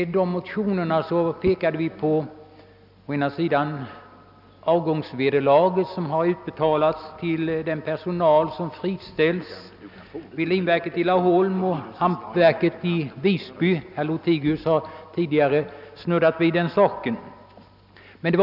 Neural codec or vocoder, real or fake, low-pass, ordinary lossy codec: none; real; 5.4 kHz; none